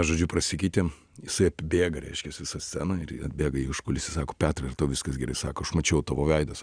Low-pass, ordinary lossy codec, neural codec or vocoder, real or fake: 9.9 kHz; Opus, 64 kbps; none; real